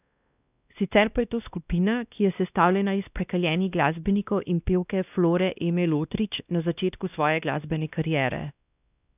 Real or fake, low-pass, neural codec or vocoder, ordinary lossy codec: fake; 3.6 kHz; codec, 16 kHz, 1 kbps, X-Codec, WavLM features, trained on Multilingual LibriSpeech; none